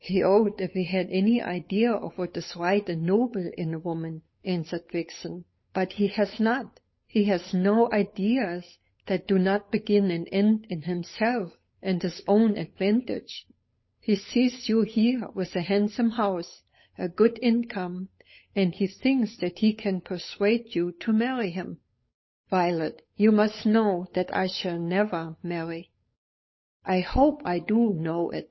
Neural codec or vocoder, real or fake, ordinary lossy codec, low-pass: codec, 16 kHz, 8 kbps, FunCodec, trained on Chinese and English, 25 frames a second; fake; MP3, 24 kbps; 7.2 kHz